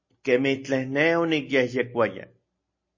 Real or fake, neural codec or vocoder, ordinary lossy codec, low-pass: real; none; MP3, 32 kbps; 7.2 kHz